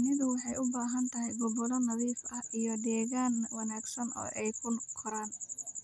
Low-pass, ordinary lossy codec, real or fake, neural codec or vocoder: none; none; real; none